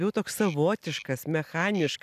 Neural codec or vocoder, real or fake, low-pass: none; real; 14.4 kHz